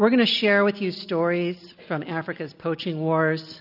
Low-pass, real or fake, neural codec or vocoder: 5.4 kHz; real; none